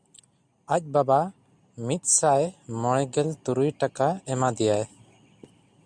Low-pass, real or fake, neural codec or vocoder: 9.9 kHz; real; none